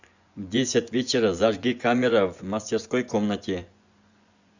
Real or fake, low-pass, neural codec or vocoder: fake; 7.2 kHz; vocoder, 24 kHz, 100 mel bands, Vocos